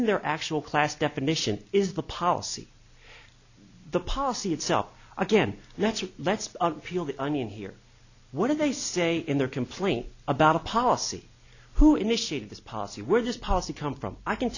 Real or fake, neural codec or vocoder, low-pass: real; none; 7.2 kHz